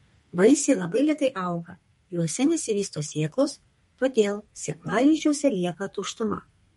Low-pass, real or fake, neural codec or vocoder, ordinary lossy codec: 14.4 kHz; fake; codec, 32 kHz, 1.9 kbps, SNAC; MP3, 48 kbps